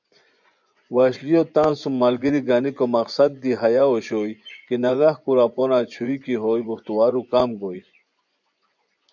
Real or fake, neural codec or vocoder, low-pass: fake; vocoder, 24 kHz, 100 mel bands, Vocos; 7.2 kHz